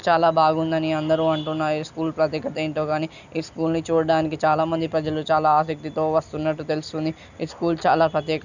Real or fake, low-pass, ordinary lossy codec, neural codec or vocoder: real; 7.2 kHz; none; none